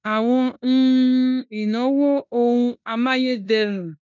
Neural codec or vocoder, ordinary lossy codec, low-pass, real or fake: codec, 16 kHz in and 24 kHz out, 0.9 kbps, LongCat-Audio-Codec, four codebook decoder; none; 7.2 kHz; fake